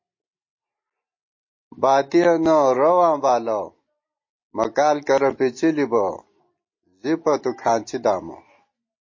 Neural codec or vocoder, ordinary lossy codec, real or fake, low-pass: none; MP3, 32 kbps; real; 7.2 kHz